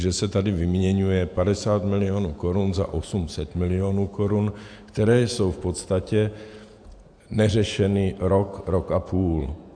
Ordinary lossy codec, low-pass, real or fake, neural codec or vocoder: AAC, 64 kbps; 9.9 kHz; fake; vocoder, 48 kHz, 128 mel bands, Vocos